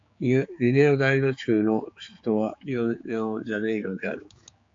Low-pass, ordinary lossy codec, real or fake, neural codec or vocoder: 7.2 kHz; AAC, 48 kbps; fake; codec, 16 kHz, 4 kbps, X-Codec, HuBERT features, trained on general audio